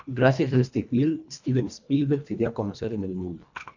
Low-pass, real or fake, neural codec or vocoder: 7.2 kHz; fake; codec, 24 kHz, 1.5 kbps, HILCodec